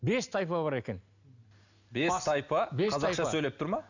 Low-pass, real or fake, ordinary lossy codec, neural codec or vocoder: 7.2 kHz; real; none; none